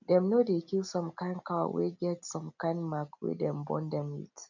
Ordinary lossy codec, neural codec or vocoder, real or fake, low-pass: none; none; real; 7.2 kHz